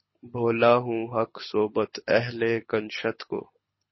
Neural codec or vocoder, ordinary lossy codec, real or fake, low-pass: codec, 24 kHz, 6 kbps, HILCodec; MP3, 24 kbps; fake; 7.2 kHz